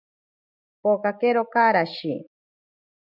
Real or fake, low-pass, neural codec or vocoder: real; 5.4 kHz; none